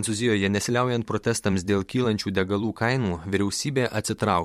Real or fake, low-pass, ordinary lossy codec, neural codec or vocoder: fake; 19.8 kHz; MP3, 64 kbps; vocoder, 44.1 kHz, 128 mel bands every 512 samples, BigVGAN v2